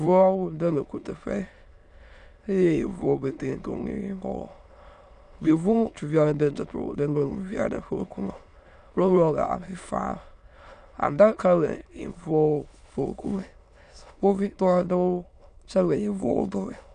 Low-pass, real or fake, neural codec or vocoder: 9.9 kHz; fake; autoencoder, 22.05 kHz, a latent of 192 numbers a frame, VITS, trained on many speakers